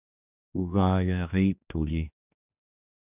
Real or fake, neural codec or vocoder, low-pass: fake; codec, 16 kHz, 2 kbps, X-Codec, HuBERT features, trained on general audio; 3.6 kHz